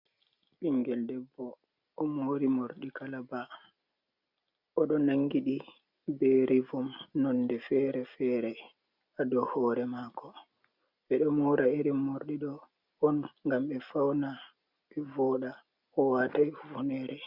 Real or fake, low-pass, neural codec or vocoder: real; 5.4 kHz; none